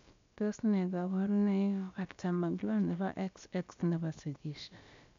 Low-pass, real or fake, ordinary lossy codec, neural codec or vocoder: 7.2 kHz; fake; MP3, 64 kbps; codec, 16 kHz, about 1 kbps, DyCAST, with the encoder's durations